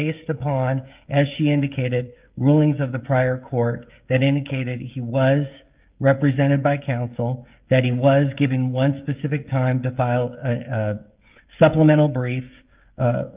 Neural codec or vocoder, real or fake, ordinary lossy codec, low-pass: codec, 16 kHz, 16 kbps, FreqCodec, smaller model; fake; Opus, 32 kbps; 3.6 kHz